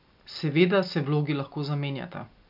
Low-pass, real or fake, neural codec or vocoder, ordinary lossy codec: 5.4 kHz; real; none; none